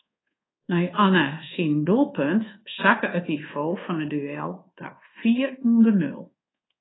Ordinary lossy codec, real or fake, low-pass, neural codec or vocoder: AAC, 16 kbps; fake; 7.2 kHz; codec, 24 kHz, 1.2 kbps, DualCodec